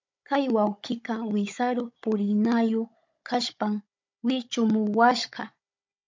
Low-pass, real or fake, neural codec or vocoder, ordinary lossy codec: 7.2 kHz; fake; codec, 16 kHz, 16 kbps, FunCodec, trained on Chinese and English, 50 frames a second; MP3, 64 kbps